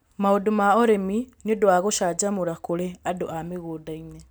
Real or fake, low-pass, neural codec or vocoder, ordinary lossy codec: real; none; none; none